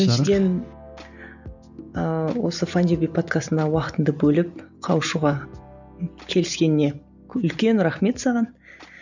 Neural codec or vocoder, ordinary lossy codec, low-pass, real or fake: none; none; 7.2 kHz; real